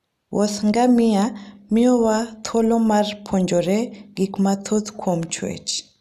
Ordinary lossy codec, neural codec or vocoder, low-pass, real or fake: none; none; 14.4 kHz; real